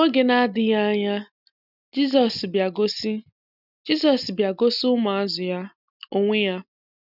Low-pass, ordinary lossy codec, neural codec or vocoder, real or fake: 5.4 kHz; none; none; real